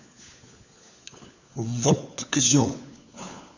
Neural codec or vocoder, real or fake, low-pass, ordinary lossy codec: codec, 16 kHz, 4 kbps, FunCodec, trained on LibriTTS, 50 frames a second; fake; 7.2 kHz; none